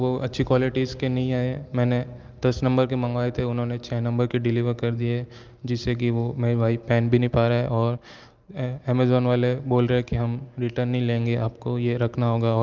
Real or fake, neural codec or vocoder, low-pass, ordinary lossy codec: real; none; 7.2 kHz; Opus, 32 kbps